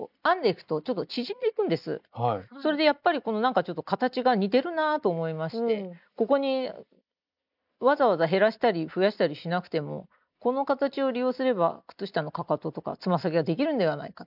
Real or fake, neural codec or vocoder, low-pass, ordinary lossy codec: real; none; 5.4 kHz; none